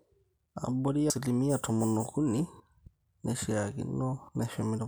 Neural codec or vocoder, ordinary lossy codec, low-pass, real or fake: none; none; none; real